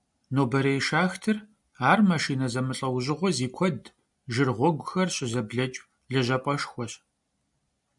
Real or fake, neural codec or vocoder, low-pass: real; none; 10.8 kHz